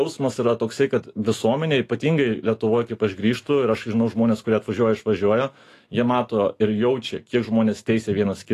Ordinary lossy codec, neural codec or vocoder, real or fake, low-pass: AAC, 48 kbps; autoencoder, 48 kHz, 128 numbers a frame, DAC-VAE, trained on Japanese speech; fake; 14.4 kHz